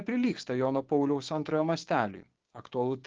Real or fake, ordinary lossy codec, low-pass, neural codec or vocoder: fake; Opus, 16 kbps; 7.2 kHz; codec, 16 kHz, 6 kbps, DAC